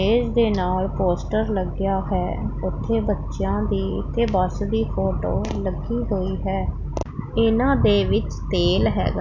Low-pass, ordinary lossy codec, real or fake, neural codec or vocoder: 7.2 kHz; none; real; none